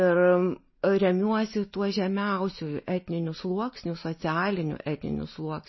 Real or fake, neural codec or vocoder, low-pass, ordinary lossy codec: real; none; 7.2 kHz; MP3, 24 kbps